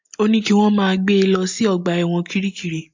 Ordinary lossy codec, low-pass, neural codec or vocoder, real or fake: MP3, 48 kbps; 7.2 kHz; none; real